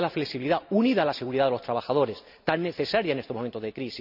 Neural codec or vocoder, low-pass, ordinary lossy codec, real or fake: none; 5.4 kHz; none; real